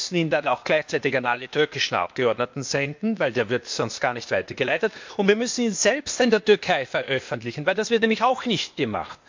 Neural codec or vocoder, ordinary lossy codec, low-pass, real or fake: codec, 16 kHz, 0.8 kbps, ZipCodec; MP3, 64 kbps; 7.2 kHz; fake